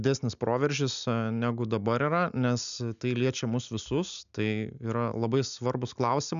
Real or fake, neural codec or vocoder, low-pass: real; none; 7.2 kHz